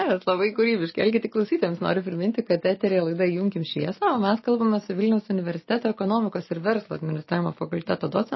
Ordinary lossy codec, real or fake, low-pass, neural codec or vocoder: MP3, 24 kbps; real; 7.2 kHz; none